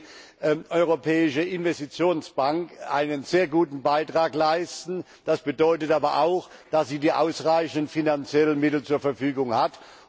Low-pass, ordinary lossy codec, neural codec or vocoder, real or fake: none; none; none; real